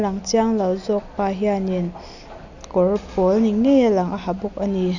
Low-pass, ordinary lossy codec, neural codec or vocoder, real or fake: 7.2 kHz; none; none; real